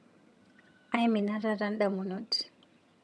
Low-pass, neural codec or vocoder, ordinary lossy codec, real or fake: none; vocoder, 22.05 kHz, 80 mel bands, HiFi-GAN; none; fake